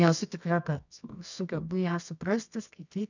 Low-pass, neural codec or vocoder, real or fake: 7.2 kHz; codec, 24 kHz, 0.9 kbps, WavTokenizer, medium music audio release; fake